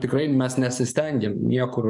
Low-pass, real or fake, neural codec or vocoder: 10.8 kHz; fake; codec, 44.1 kHz, 7.8 kbps, DAC